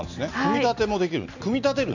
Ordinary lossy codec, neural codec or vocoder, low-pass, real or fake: none; none; 7.2 kHz; real